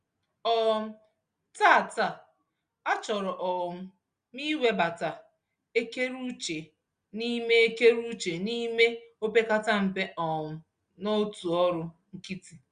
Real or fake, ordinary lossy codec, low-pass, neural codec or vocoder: real; Opus, 64 kbps; 9.9 kHz; none